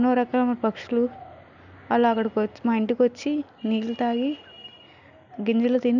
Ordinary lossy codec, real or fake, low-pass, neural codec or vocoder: none; real; 7.2 kHz; none